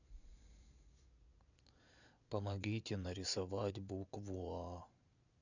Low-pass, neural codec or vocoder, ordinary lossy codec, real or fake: 7.2 kHz; vocoder, 22.05 kHz, 80 mel bands, Vocos; none; fake